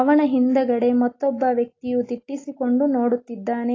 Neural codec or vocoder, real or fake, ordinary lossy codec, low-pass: none; real; AAC, 32 kbps; 7.2 kHz